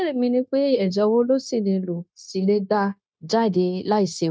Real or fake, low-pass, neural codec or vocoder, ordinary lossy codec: fake; none; codec, 16 kHz, 0.9 kbps, LongCat-Audio-Codec; none